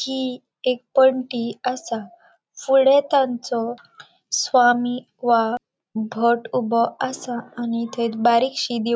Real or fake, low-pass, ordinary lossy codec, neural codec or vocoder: real; none; none; none